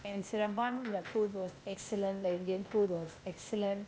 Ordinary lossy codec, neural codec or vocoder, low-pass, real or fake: none; codec, 16 kHz, 0.8 kbps, ZipCodec; none; fake